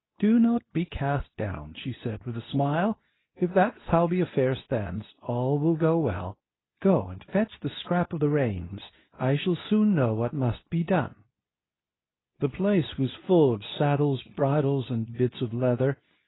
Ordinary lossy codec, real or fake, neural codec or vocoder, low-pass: AAC, 16 kbps; fake; codec, 24 kHz, 0.9 kbps, WavTokenizer, medium speech release version 2; 7.2 kHz